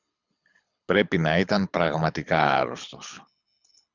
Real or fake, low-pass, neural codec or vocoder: fake; 7.2 kHz; codec, 24 kHz, 6 kbps, HILCodec